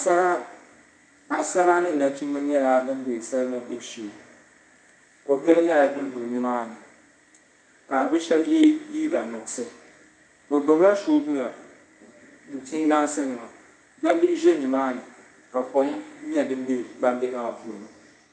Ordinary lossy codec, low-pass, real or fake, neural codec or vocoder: MP3, 64 kbps; 9.9 kHz; fake; codec, 24 kHz, 0.9 kbps, WavTokenizer, medium music audio release